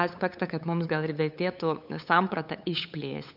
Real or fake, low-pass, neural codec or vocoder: fake; 5.4 kHz; codec, 16 kHz, 8 kbps, FunCodec, trained on LibriTTS, 25 frames a second